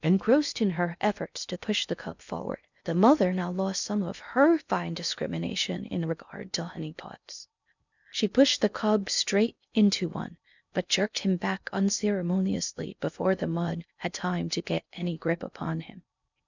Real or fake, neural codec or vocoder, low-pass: fake; codec, 16 kHz in and 24 kHz out, 0.6 kbps, FocalCodec, streaming, 2048 codes; 7.2 kHz